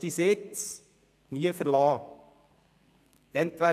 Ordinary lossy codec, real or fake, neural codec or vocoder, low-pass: none; fake; codec, 32 kHz, 1.9 kbps, SNAC; 14.4 kHz